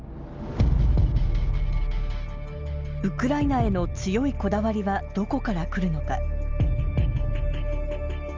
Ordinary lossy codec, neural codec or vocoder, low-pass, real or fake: Opus, 24 kbps; none; 7.2 kHz; real